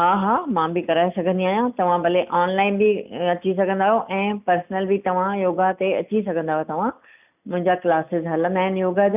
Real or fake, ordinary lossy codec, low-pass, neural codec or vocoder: real; none; 3.6 kHz; none